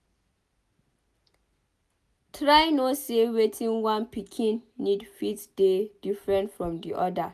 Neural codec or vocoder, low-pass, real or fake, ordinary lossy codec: none; 14.4 kHz; real; none